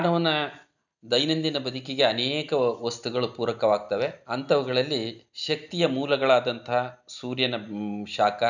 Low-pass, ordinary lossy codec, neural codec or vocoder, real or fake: 7.2 kHz; none; none; real